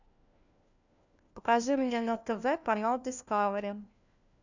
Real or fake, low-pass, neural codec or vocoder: fake; 7.2 kHz; codec, 16 kHz, 1 kbps, FunCodec, trained on LibriTTS, 50 frames a second